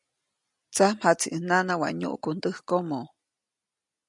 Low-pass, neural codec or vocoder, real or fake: 10.8 kHz; none; real